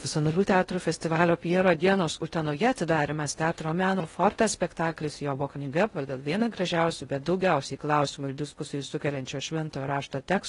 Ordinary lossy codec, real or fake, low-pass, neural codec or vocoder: AAC, 32 kbps; fake; 10.8 kHz; codec, 16 kHz in and 24 kHz out, 0.6 kbps, FocalCodec, streaming, 2048 codes